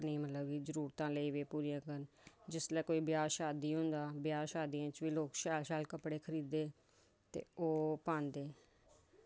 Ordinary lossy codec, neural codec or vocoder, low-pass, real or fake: none; none; none; real